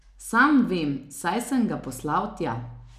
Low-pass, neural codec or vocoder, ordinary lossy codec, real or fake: 14.4 kHz; none; none; real